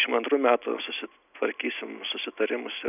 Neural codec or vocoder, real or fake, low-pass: none; real; 3.6 kHz